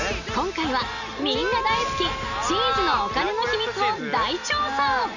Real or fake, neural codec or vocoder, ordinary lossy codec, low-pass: real; none; none; 7.2 kHz